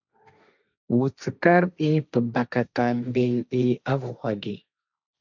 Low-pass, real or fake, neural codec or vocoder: 7.2 kHz; fake; codec, 16 kHz, 1.1 kbps, Voila-Tokenizer